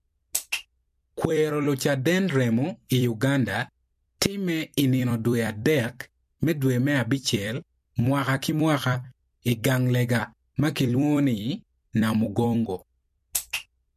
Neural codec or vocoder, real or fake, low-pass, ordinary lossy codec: vocoder, 44.1 kHz, 128 mel bands every 256 samples, BigVGAN v2; fake; 14.4 kHz; MP3, 64 kbps